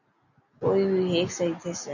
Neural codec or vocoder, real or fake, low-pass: none; real; 7.2 kHz